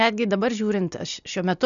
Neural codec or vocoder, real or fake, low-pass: none; real; 7.2 kHz